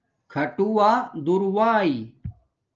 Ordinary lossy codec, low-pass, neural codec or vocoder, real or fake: Opus, 24 kbps; 7.2 kHz; none; real